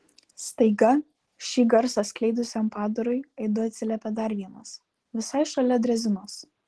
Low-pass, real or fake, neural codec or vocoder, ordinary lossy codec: 10.8 kHz; real; none; Opus, 16 kbps